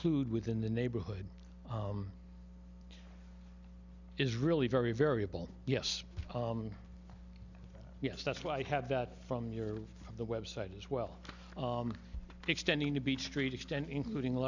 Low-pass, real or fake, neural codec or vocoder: 7.2 kHz; real; none